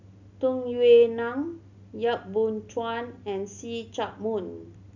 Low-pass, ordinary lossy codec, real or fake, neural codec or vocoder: 7.2 kHz; none; real; none